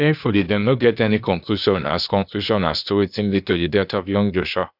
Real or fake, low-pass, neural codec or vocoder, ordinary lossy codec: fake; 5.4 kHz; codec, 16 kHz, 0.8 kbps, ZipCodec; none